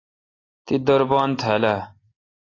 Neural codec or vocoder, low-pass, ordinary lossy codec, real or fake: none; 7.2 kHz; AAC, 32 kbps; real